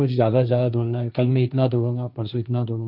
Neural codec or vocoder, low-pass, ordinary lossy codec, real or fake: codec, 16 kHz, 1.1 kbps, Voila-Tokenizer; 5.4 kHz; none; fake